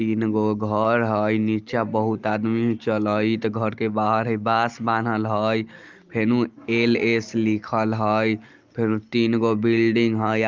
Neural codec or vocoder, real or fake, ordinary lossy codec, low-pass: none; real; Opus, 32 kbps; 7.2 kHz